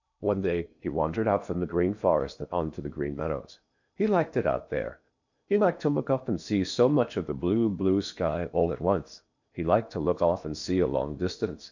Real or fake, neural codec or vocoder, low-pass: fake; codec, 16 kHz in and 24 kHz out, 0.8 kbps, FocalCodec, streaming, 65536 codes; 7.2 kHz